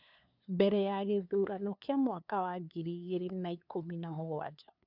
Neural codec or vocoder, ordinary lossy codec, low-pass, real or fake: codec, 16 kHz, 2 kbps, FunCodec, trained on LibriTTS, 25 frames a second; none; 5.4 kHz; fake